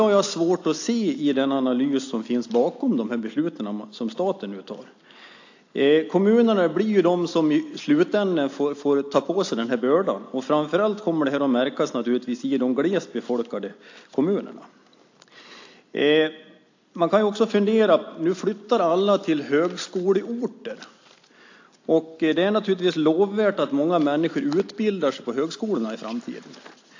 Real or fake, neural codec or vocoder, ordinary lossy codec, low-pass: real; none; AAC, 48 kbps; 7.2 kHz